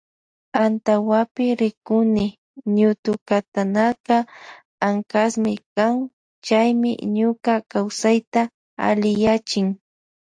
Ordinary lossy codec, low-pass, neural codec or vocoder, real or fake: AAC, 64 kbps; 9.9 kHz; none; real